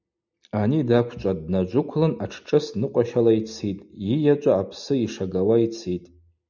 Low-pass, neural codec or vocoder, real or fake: 7.2 kHz; none; real